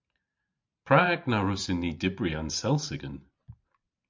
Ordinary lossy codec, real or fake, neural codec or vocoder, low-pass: MP3, 64 kbps; fake; vocoder, 44.1 kHz, 128 mel bands every 512 samples, BigVGAN v2; 7.2 kHz